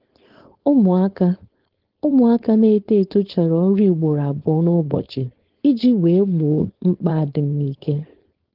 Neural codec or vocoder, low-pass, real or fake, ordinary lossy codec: codec, 16 kHz, 4.8 kbps, FACodec; 5.4 kHz; fake; Opus, 16 kbps